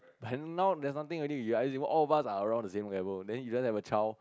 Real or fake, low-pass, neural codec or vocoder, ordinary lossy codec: real; none; none; none